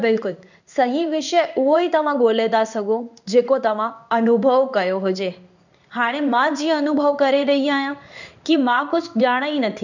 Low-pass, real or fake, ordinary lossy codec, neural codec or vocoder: 7.2 kHz; fake; none; codec, 16 kHz in and 24 kHz out, 1 kbps, XY-Tokenizer